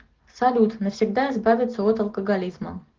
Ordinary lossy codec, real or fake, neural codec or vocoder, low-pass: Opus, 16 kbps; real; none; 7.2 kHz